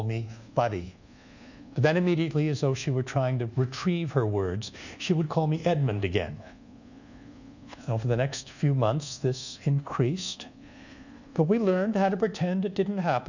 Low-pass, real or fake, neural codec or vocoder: 7.2 kHz; fake; codec, 24 kHz, 1.2 kbps, DualCodec